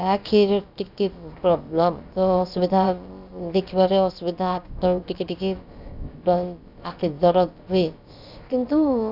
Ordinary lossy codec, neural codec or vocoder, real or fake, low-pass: none; codec, 16 kHz, about 1 kbps, DyCAST, with the encoder's durations; fake; 5.4 kHz